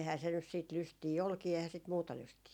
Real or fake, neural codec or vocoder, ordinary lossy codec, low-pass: real; none; none; 19.8 kHz